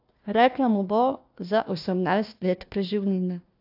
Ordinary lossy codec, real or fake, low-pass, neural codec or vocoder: AAC, 48 kbps; fake; 5.4 kHz; codec, 16 kHz, 1 kbps, FunCodec, trained on Chinese and English, 50 frames a second